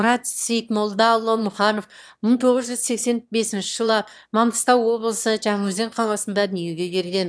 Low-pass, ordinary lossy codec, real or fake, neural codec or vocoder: none; none; fake; autoencoder, 22.05 kHz, a latent of 192 numbers a frame, VITS, trained on one speaker